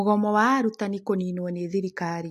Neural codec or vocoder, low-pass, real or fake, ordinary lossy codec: none; 14.4 kHz; real; AAC, 64 kbps